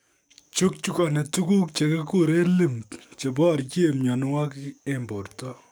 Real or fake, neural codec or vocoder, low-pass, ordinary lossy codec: fake; codec, 44.1 kHz, 7.8 kbps, DAC; none; none